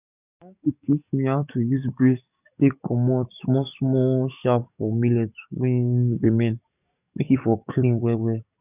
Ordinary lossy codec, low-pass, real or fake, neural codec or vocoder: none; 3.6 kHz; fake; codec, 44.1 kHz, 7.8 kbps, DAC